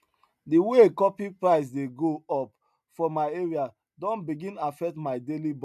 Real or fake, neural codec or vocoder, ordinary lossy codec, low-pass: real; none; none; 14.4 kHz